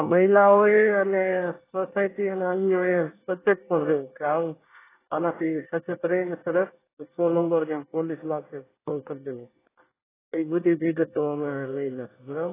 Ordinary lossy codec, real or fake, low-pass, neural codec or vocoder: AAC, 16 kbps; fake; 3.6 kHz; codec, 24 kHz, 1 kbps, SNAC